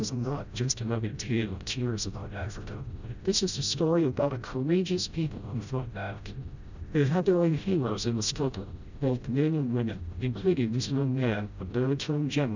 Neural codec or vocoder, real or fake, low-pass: codec, 16 kHz, 0.5 kbps, FreqCodec, smaller model; fake; 7.2 kHz